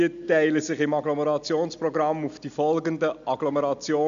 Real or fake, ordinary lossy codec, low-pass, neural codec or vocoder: real; AAC, 96 kbps; 7.2 kHz; none